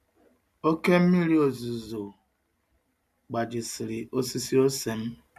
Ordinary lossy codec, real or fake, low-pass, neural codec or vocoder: none; real; 14.4 kHz; none